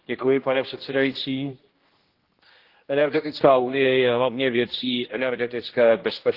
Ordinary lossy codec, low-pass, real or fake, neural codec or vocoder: Opus, 16 kbps; 5.4 kHz; fake; codec, 16 kHz, 1 kbps, X-Codec, HuBERT features, trained on general audio